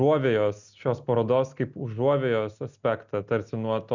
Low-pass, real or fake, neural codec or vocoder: 7.2 kHz; real; none